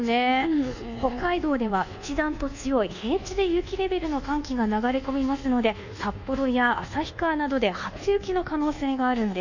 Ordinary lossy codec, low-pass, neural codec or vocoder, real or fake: none; 7.2 kHz; codec, 24 kHz, 1.2 kbps, DualCodec; fake